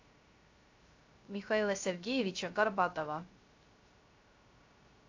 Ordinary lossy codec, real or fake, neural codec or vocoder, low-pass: AAC, 48 kbps; fake; codec, 16 kHz, 0.3 kbps, FocalCodec; 7.2 kHz